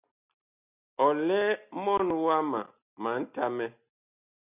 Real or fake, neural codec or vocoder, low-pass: real; none; 3.6 kHz